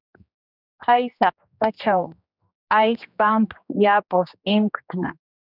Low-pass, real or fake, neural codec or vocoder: 5.4 kHz; fake; codec, 16 kHz, 2 kbps, X-Codec, HuBERT features, trained on general audio